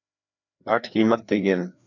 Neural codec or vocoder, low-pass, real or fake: codec, 16 kHz, 2 kbps, FreqCodec, larger model; 7.2 kHz; fake